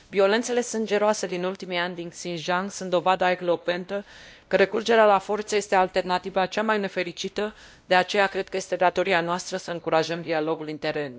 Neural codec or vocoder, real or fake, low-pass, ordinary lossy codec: codec, 16 kHz, 1 kbps, X-Codec, WavLM features, trained on Multilingual LibriSpeech; fake; none; none